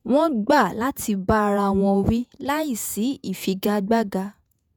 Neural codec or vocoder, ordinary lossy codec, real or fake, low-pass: vocoder, 48 kHz, 128 mel bands, Vocos; none; fake; none